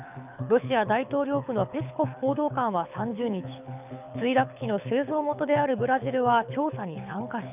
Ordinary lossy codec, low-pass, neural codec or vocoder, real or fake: none; 3.6 kHz; codec, 24 kHz, 6 kbps, HILCodec; fake